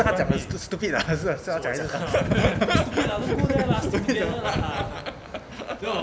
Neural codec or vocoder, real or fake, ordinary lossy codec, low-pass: none; real; none; none